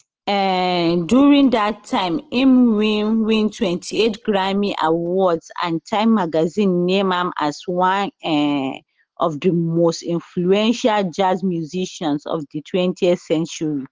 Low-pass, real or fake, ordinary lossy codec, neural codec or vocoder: 7.2 kHz; real; Opus, 16 kbps; none